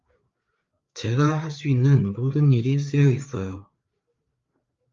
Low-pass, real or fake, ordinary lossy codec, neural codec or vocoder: 7.2 kHz; fake; Opus, 32 kbps; codec, 16 kHz, 4 kbps, FreqCodec, larger model